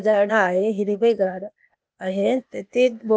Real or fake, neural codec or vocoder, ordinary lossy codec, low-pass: fake; codec, 16 kHz, 0.8 kbps, ZipCodec; none; none